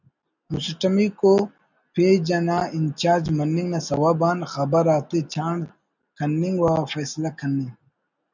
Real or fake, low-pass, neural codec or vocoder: real; 7.2 kHz; none